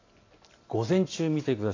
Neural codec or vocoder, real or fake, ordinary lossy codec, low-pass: none; real; AAC, 32 kbps; 7.2 kHz